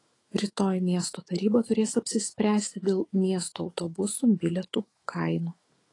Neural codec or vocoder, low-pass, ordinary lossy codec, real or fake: autoencoder, 48 kHz, 128 numbers a frame, DAC-VAE, trained on Japanese speech; 10.8 kHz; AAC, 32 kbps; fake